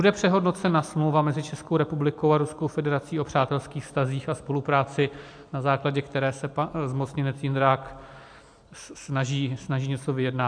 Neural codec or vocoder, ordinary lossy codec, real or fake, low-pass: none; AAC, 64 kbps; real; 9.9 kHz